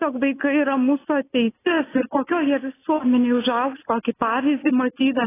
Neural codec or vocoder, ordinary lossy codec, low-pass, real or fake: none; AAC, 16 kbps; 3.6 kHz; real